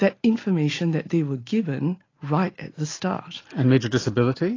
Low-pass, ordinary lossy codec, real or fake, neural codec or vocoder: 7.2 kHz; AAC, 32 kbps; real; none